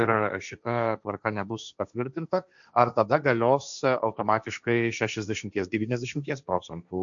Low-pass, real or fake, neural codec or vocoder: 7.2 kHz; fake; codec, 16 kHz, 1.1 kbps, Voila-Tokenizer